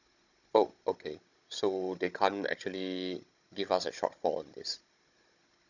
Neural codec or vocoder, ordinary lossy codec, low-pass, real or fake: codec, 16 kHz, 16 kbps, FunCodec, trained on Chinese and English, 50 frames a second; none; 7.2 kHz; fake